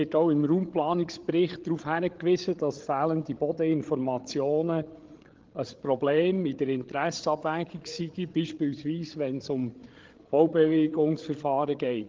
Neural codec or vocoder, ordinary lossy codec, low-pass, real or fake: codec, 16 kHz, 8 kbps, FreqCodec, larger model; Opus, 16 kbps; 7.2 kHz; fake